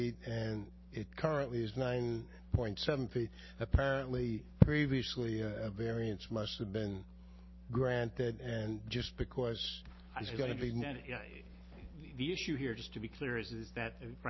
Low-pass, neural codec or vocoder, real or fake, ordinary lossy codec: 7.2 kHz; none; real; MP3, 24 kbps